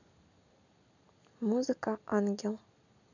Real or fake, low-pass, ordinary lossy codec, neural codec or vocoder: real; 7.2 kHz; none; none